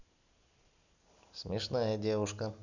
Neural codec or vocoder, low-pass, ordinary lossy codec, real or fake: none; 7.2 kHz; none; real